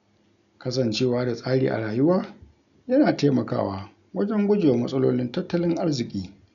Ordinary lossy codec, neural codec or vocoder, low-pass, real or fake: none; none; 7.2 kHz; real